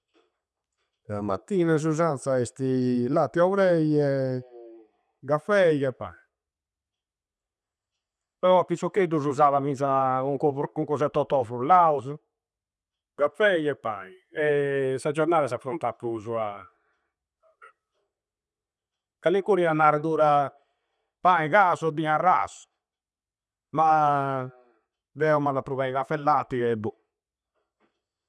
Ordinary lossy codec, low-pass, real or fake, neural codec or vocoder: none; none; real; none